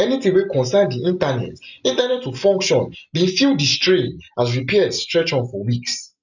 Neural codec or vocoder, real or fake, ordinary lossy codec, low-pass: none; real; none; 7.2 kHz